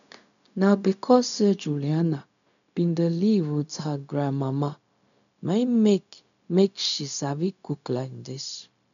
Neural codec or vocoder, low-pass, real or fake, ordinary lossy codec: codec, 16 kHz, 0.4 kbps, LongCat-Audio-Codec; 7.2 kHz; fake; none